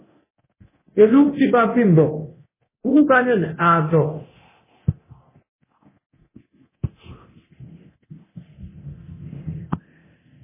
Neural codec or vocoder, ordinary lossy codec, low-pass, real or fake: codec, 24 kHz, 0.9 kbps, DualCodec; MP3, 16 kbps; 3.6 kHz; fake